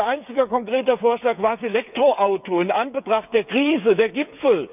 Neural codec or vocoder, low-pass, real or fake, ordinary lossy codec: codec, 16 kHz, 8 kbps, FreqCodec, smaller model; 3.6 kHz; fake; none